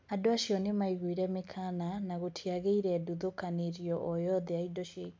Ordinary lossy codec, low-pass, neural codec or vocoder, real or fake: none; none; none; real